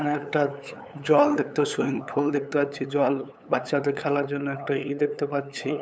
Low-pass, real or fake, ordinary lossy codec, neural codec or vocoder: none; fake; none; codec, 16 kHz, 8 kbps, FunCodec, trained on LibriTTS, 25 frames a second